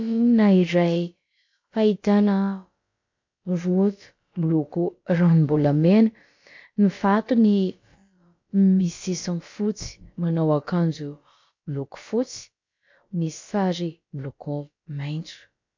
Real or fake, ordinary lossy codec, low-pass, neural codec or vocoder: fake; MP3, 48 kbps; 7.2 kHz; codec, 16 kHz, about 1 kbps, DyCAST, with the encoder's durations